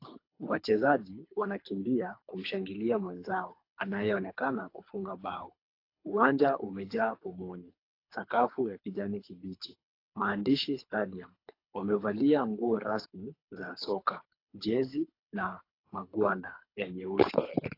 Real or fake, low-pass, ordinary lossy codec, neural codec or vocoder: fake; 5.4 kHz; AAC, 32 kbps; codec, 24 kHz, 3 kbps, HILCodec